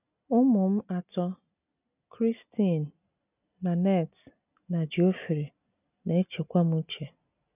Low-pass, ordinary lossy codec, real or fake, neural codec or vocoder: 3.6 kHz; none; real; none